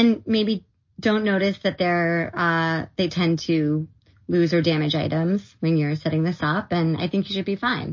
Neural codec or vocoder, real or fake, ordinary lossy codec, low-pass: none; real; MP3, 32 kbps; 7.2 kHz